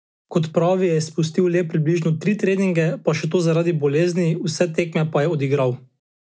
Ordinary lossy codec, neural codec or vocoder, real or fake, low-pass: none; none; real; none